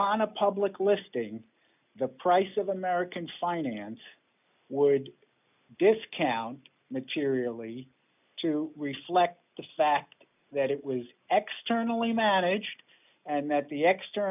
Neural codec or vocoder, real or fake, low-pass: none; real; 3.6 kHz